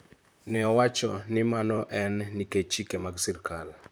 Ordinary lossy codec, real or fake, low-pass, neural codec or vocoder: none; fake; none; vocoder, 44.1 kHz, 128 mel bands, Pupu-Vocoder